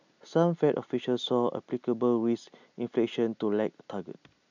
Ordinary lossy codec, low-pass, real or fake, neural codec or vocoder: none; 7.2 kHz; real; none